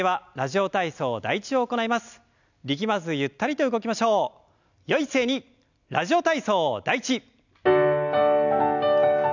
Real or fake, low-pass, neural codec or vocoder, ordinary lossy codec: real; 7.2 kHz; none; none